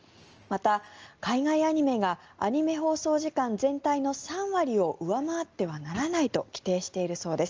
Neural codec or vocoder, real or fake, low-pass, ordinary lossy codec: none; real; 7.2 kHz; Opus, 24 kbps